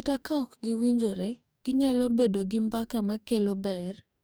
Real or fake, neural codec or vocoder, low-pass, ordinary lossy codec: fake; codec, 44.1 kHz, 2.6 kbps, DAC; none; none